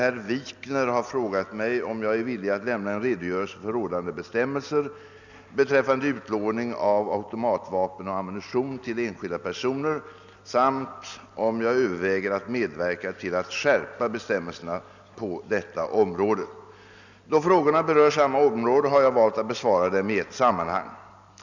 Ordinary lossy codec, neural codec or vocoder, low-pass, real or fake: none; none; 7.2 kHz; real